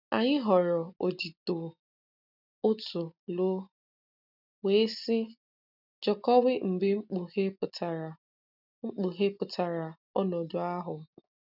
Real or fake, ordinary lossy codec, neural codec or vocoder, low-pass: real; none; none; 5.4 kHz